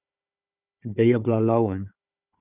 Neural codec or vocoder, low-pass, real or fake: codec, 16 kHz, 4 kbps, FunCodec, trained on Chinese and English, 50 frames a second; 3.6 kHz; fake